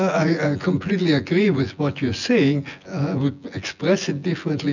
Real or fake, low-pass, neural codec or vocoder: fake; 7.2 kHz; vocoder, 24 kHz, 100 mel bands, Vocos